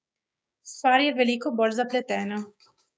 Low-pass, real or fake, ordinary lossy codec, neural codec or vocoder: none; fake; none; codec, 16 kHz, 6 kbps, DAC